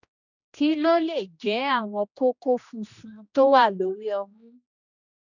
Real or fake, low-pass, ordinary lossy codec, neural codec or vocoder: fake; 7.2 kHz; none; codec, 16 kHz, 1 kbps, X-Codec, HuBERT features, trained on general audio